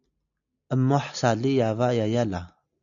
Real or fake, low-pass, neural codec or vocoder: real; 7.2 kHz; none